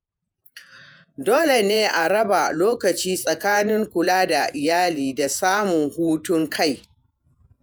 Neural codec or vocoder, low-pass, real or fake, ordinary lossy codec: vocoder, 48 kHz, 128 mel bands, Vocos; none; fake; none